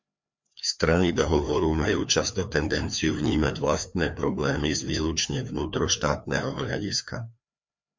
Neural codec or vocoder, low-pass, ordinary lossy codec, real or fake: codec, 16 kHz, 2 kbps, FreqCodec, larger model; 7.2 kHz; MP3, 64 kbps; fake